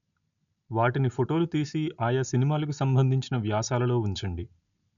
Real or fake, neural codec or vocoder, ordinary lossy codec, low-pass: real; none; none; 7.2 kHz